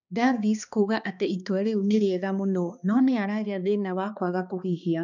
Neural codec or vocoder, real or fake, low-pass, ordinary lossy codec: codec, 16 kHz, 2 kbps, X-Codec, HuBERT features, trained on balanced general audio; fake; 7.2 kHz; none